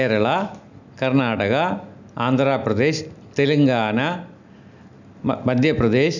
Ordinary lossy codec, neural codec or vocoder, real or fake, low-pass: none; none; real; 7.2 kHz